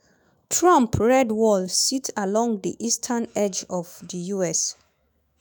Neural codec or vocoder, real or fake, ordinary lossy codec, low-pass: autoencoder, 48 kHz, 128 numbers a frame, DAC-VAE, trained on Japanese speech; fake; none; none